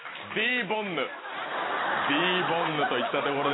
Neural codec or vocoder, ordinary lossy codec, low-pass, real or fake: none; AAC, 16 kbps; 7.2 kHz; real